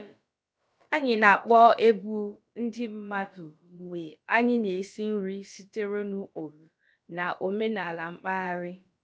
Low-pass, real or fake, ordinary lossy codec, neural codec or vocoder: none; fake; none; codec, 16 kHz, about 1 kbps, DyCAST, with the encoder's durations